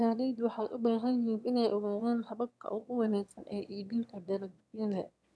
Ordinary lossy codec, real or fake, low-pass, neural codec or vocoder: none; fake; none; autoencoder, 22.05 kHz, a latent of 192 numbers a frame, VITS, trained on one speaker